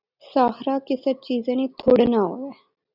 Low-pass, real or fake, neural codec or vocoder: 5.4 kHz; real; none